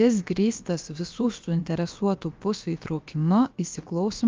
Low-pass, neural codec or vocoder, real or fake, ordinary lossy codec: 7.2 kHz; codec, 16 kHz, about 1 kbps, DyCAST, with the encoder's durations; fake; Opus, 24 kbps